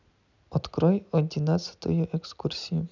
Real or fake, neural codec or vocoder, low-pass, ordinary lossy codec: real; none; 7.2 kHz; none